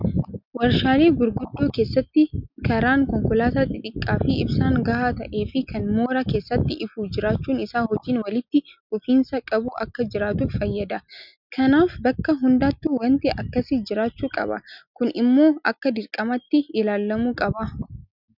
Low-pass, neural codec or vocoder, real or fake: 5.4 kHz; none; real